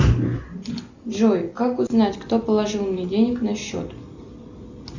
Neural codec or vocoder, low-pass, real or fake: none; 7.2 kHz; real